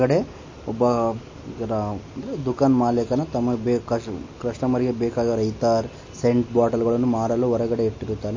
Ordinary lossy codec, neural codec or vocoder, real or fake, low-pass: MP3, 32 kbps; none; real; 7.2 kHz